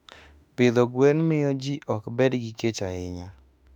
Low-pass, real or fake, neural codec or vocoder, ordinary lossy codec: 19.8 kHz; fake; autoencoder, 48 kHz, 32 numbers a frame, DAC-VAE, trained on Japanese speech; none